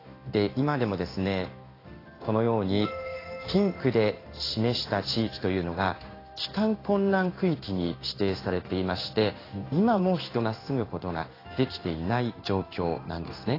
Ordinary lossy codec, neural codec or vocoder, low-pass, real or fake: AAC, 24 kbps; codec, 16 kHz in and 24 kHz out, 1 kbps, XY-Tokenizer; 5.4 kHz; fake